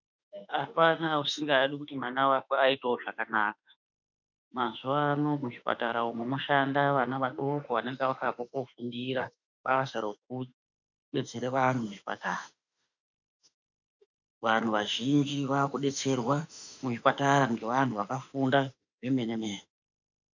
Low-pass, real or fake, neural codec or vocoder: 7.2 kHz; fake; autoencoder, 48 kHz, 32 numbers a frame, DAC-VAE, trained on Japanese speech